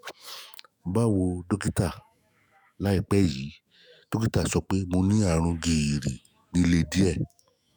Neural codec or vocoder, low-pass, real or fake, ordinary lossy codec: autoencoder, 48 kHz, 128 numbers a frame, DAC-VAE, trained on Japanese speech; none; fake; none